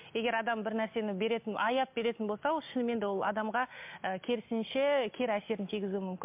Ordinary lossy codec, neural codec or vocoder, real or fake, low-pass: MP3, 32 kbps; none; real; 3.6 kHz